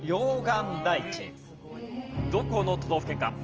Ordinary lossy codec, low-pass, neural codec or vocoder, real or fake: Opus, 24 kbps; 7.2 kHz; none; real